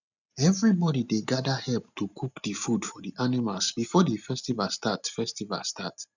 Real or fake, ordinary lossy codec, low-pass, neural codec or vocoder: real; none; 7.2 kHz; none